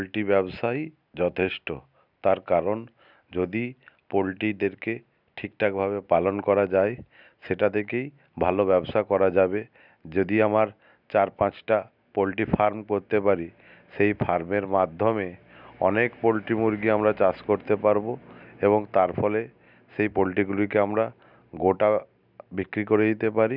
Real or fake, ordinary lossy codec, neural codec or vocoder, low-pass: real; none; none; 5.4 kHz